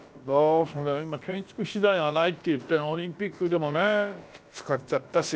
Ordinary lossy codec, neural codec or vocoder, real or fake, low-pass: none; codec, 16 kHz, about 1 kbps, DyCAST, with the encoder's durations; fake; none